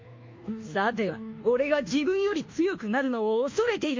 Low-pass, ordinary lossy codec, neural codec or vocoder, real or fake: 7.2 kHz; MP3, 48 kbps; codec, 24 kHz, 1.2 kbps, DualCodec; fake